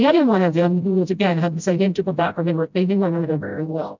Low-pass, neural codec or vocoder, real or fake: 7.2 kHz; codec, 16 kHz, 0.5 kbps, FreqCodec, smaller model; fake